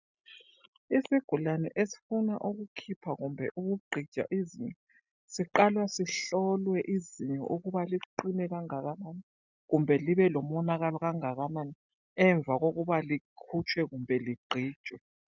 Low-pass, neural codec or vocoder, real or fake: 7.2 kHz; none; real